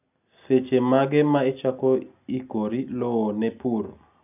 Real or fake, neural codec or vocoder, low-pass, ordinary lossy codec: real; none; 3.6 kHz; none